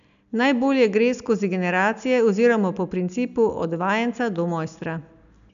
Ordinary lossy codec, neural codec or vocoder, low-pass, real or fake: none; none; 7.2 kHz; real